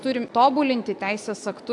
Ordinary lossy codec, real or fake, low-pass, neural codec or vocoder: AAC, 64 kbps; real; 10.8 kHz; none